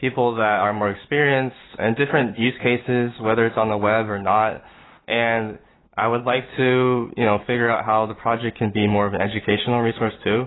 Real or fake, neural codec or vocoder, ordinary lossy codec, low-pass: real; none; AAC, 16 kbps; 7.2 kHz